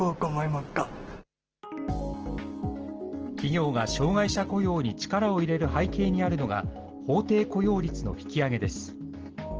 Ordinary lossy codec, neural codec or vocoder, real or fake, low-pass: Opus, 16 kbps; none; real; 7.2 kHz